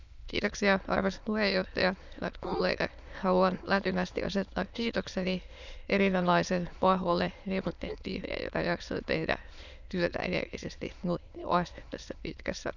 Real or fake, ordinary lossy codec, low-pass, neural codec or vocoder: fake; none; 7.2 kHz; autoencoder, 22.05 kHz, a latent of 192 numbers a frame, VITS, trained on many speakers